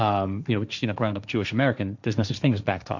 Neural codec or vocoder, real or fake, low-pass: codec, 16 kHz, 1.1 kbps, Voila-Tokenizer; fake; 7.2 kHz